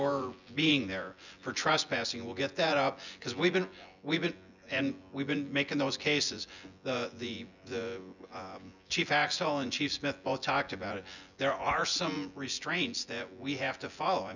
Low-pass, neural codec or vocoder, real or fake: 7.2 kHz; vocoder, 24 kHz, 100 mel bands, Vocos; fake